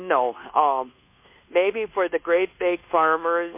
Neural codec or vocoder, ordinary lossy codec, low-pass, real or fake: codec, 24 kHz, 1.2 kbps, DualCodec; MP3, 24 kbps; 3.6 kHz; fake